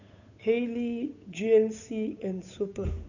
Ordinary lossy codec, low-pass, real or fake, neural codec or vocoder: none; 7.2 kHz; fake; codec, 16 kHz, 8 kbps, FunCodec, trained on Chinese and English, 25 frames a second